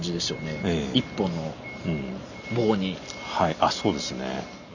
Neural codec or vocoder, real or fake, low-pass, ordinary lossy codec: none; real; 7.2 kHz; none